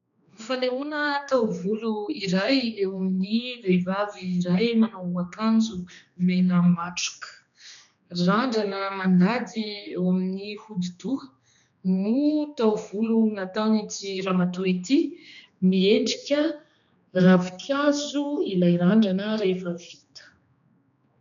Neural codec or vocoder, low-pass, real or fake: codec, 16 kHz, 2 kbps, X-Codec, HuBERT features, trained on general audio; 7.2 kHz; fake